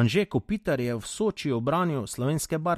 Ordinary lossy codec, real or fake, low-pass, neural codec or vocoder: MP3, 64 kbps; real; 14.4 kHz; none